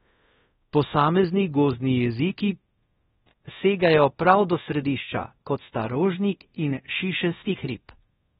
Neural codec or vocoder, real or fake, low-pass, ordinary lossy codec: codec, 24 kHz, 0.5 kbps, DualCodec; fake; 10.8 kHz; AAC, 16 kbps